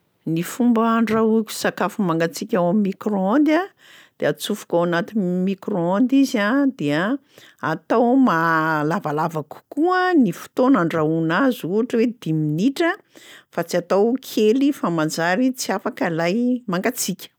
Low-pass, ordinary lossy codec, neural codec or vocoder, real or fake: none; none; none; real